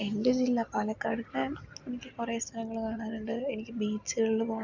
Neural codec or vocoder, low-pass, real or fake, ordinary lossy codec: none; 7.2 kHz; real; none